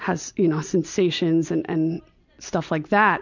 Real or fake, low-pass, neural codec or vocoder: real; 7.2 kHz; none